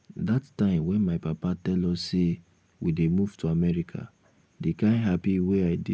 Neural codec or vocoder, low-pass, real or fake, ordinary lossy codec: none; none; real; none